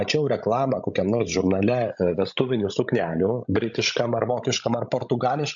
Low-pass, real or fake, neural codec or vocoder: 7.2 kHz; fake; codec, 16 kHz, 16 kbps, FreqCodec, larger model